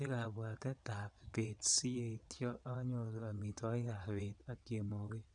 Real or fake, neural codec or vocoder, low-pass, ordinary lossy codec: fake; vocoder, 22.05 kHz, 80 mel bands, WaveNeXt; 9.9 kHz; none